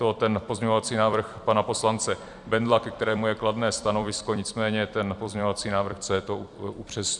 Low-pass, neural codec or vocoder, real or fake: 10.8 kHz; vocoder, 44.1 kHz, 128 mel bands every 256 samples, BigVGAN v2; fake